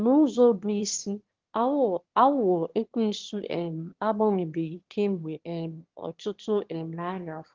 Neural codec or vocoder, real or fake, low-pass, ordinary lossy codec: autoencoder, 22.05 kHz, a latent of 192 numbers a frame, VITS, trained on one speaker; fake; 7.2 kHz; Opus, 16 kbps